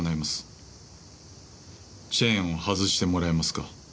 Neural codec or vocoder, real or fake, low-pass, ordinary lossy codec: none; real; none; none